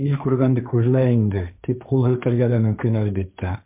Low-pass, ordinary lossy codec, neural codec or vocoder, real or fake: 3.6 kHz; MP3, 32 kbps; codec, 16 kHz, 1.1 kbps, Voila-Tokenizer; fake